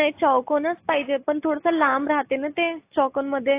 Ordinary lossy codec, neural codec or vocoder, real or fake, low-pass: AAC, 24 kbps; none; real; 3.6 kHz